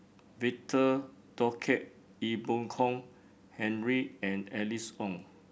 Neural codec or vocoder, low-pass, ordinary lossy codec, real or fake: none; none; none; real